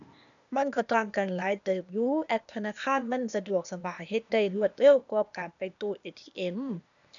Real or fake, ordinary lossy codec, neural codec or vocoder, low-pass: fake; none; codec, 16 kHz, 0.8 kbps, ZipCodec; 7.2 kHz